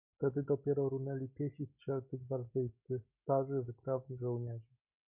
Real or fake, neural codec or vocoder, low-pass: real; none; 3.6 kHz